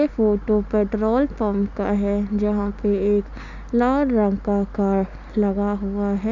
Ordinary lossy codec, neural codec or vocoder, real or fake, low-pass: none; none; real; 7.2 kHz